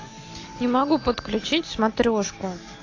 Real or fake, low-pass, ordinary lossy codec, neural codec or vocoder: real; 7.2 kHz; AAC, 32 kbps; none